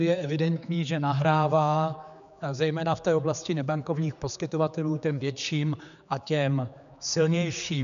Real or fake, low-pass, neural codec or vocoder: fake; 7.2 kHz; codec, 16 kHz, 4 kbps, X-Codec, HuBERT features, trained on general audio